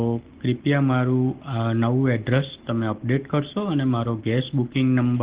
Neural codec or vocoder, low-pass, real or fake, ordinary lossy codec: none; 3.6 kHz; real; Opus, 16 kbps